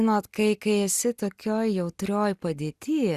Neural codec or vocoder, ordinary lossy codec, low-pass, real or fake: none; Opus, 64 kbps; 14.4 kHz; real